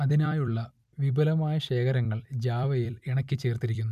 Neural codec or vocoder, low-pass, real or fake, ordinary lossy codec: vocoder, 44.1 kHz, 128 mel bands every 256 samples, BigVGAN v2; 14.4 kHz; fake; none